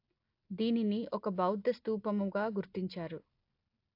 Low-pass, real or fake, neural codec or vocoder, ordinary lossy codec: 5.4 kHz; real; none; AAC, 32 kbps